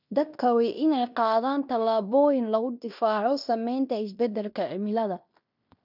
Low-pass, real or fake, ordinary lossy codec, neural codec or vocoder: 5.4 kHz; fake; none; codec, 16 kHz in and 24 kHz out, 0.9 kbps, LongCat-Audio-Codec, fine tuned four codebook decoder